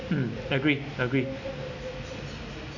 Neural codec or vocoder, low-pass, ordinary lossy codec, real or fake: none; 7.2 kHz; none; real